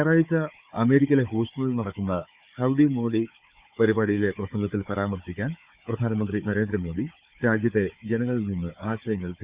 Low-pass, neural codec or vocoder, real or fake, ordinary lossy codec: 3.6 kHz; codec, 16 kHz, 4 kbps, FunCodec, trained on Chinese and English, 50 frames a second; fake; Opus, 64 kbps